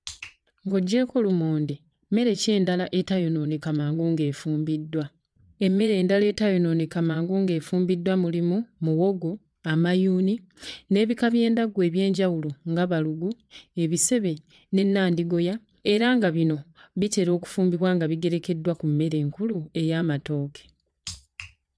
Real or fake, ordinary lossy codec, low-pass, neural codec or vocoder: fake; none; none; vocoder, 22.05 kHz, 80 mel bands, Vocos